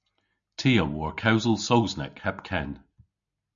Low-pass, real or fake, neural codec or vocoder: 7.2 kHz; real; none